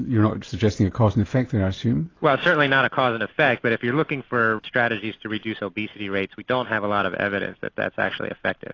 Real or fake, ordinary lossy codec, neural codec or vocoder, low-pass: real; AAC, 32 kbps; none; 7.2 kHz